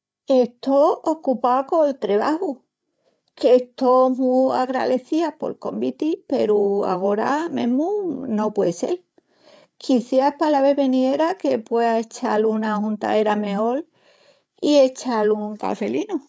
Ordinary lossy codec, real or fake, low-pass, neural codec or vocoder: none; fake; none; codec, 16 kHz, 8 kbps, FreqCodec, larger model